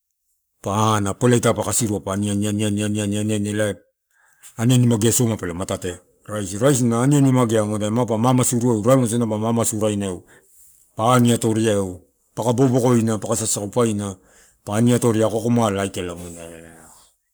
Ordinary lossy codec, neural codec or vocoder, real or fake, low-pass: none; codec, 44.1 kHz, 7.8 kbps, Pupu-Codec; fake; none